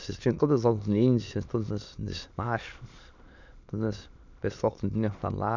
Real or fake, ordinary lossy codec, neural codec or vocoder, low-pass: fake; none; autoencoder, 22.05 kHz, a latent of 192 numbers a frame, VITS, trained on many speakers; 7.2 kHz